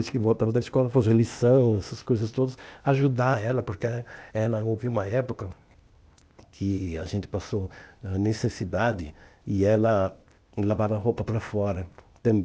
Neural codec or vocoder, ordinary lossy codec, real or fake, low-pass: codec, 16 kHz, 0.8 kbps, ZipCodec; none; fake; none